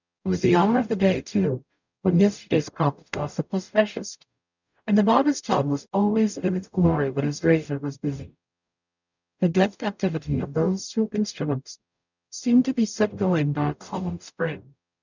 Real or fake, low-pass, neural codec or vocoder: fake; 7.2 kHz; codec, 44.1 kHz, 0.9 kbps, DAC